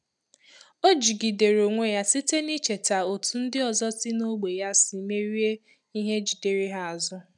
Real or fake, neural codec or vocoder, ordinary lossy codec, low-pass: real; none; none; 10.8 kHz